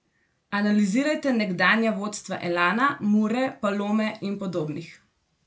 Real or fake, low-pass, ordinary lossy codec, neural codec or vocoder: real; none; none; none